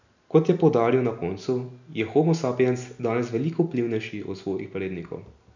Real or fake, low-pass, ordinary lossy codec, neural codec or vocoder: real; 7.2 kHz; none; none